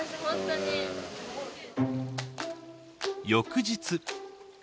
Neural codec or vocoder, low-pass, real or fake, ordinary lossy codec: none; none; real; none